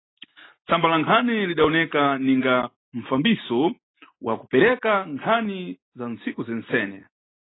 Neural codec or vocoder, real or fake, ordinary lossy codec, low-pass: none; real; AAC, 16 kbps; 7.2 kHz